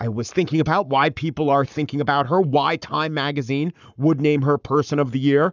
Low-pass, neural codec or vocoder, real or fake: 7.2 kHz; codec, 44.1 kHz, 7.8 kbps, Pupu-Codec; fake